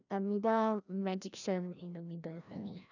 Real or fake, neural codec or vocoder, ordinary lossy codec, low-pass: fake; codec, 16 kHz, 1 kbps, FreqCodec, larger model; none; 7.2 kHz